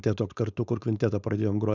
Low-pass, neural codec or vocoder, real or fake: 7.2 kHz; codec, 16 kHz, 4.8 kbps, FACodec; fake